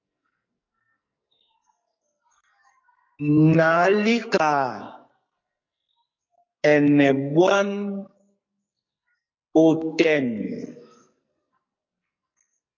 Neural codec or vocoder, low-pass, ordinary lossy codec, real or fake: codec, 44.1 kHz, 2.6 kbps, SNAC; 7.2 kHz; MP3, 48 kbps; fake